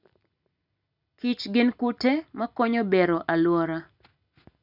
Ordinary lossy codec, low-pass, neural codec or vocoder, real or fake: none; 5.4 kHz; none; real